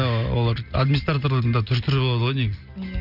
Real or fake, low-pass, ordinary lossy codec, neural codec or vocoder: real; 5.4 kHz; none; none